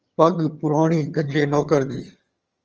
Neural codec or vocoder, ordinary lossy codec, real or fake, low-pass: vocoder, 22.05 kHz, 80 mel bands, HiFi-GAN; Opus, 24 kbps; fake; 7.2 kHz